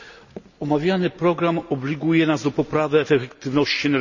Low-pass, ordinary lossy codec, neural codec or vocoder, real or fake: 7.2 kHz; none; none; real